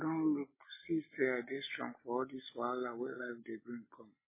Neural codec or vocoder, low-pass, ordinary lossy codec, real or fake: none; 3.6 kHz; MP3, 16 kbps; real